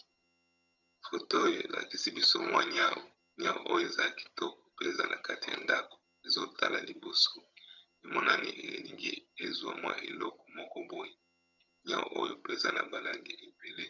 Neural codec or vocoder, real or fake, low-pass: vocoder, 22.05 kHz, 80 mel bands, HiFi-GAN; fake; 7.2 kHz